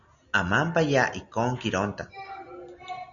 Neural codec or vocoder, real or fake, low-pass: none; real; 7.2 kHz